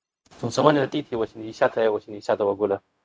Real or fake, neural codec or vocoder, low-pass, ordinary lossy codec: fake; codec, 16 kHz, 0.4 kbps, LongCat-Audio-Codec; none; none